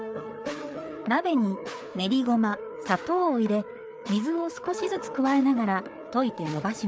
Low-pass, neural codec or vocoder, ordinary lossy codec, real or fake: none; codec, 16 kHz, 4 kbps, FreqCodec, larger model; none; fake